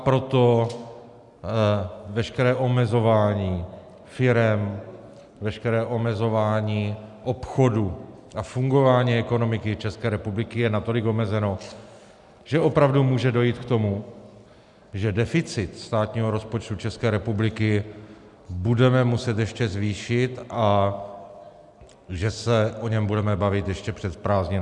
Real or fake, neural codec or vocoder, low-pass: real; none; 10.8 kHz